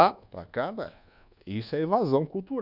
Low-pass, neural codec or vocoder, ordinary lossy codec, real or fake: 5.4 kHz; codec, 24 kHz, 1.2 kbps, DualCodec; none; fake